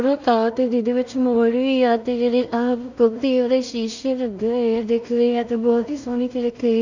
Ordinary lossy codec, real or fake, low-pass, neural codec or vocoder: none; fake; 7.2 kHz; codec, 16 kHz in and 24 kHz out, 0.4 kbps, LongCat-Audio-Codec, two codebook decoder